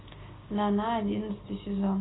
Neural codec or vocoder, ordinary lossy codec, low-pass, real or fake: none; AAC, 16 kbps; 7.2 kHz; real